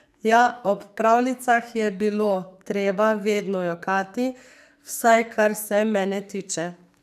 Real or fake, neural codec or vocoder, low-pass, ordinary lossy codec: fake; codec, 44.1 kHz, 2.6 kbps, SNAC; 14.4 kHz; none